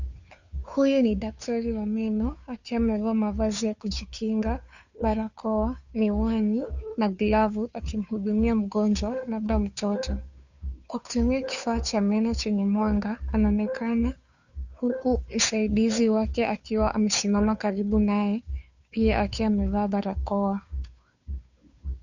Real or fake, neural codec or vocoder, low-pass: fake; codec, 16 kHz, 2 kbps, FunCodec, trained on Chinese and English, 25 frames a second; 7.2 kHz